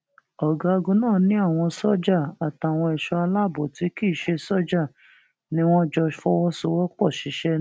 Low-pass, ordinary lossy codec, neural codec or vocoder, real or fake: none; none; none; real